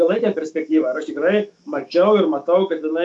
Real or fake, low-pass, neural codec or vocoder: fake; 10.8 kHz; autoencoder, 48 kHz, 128 numbers a frame, DAC-VAE, trained on Japanese speech